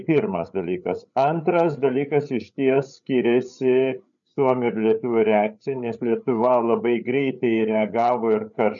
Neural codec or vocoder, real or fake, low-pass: codec, 16 kHz, 8 kbps, FreqCodec, larger model; fake; 7.2 kHz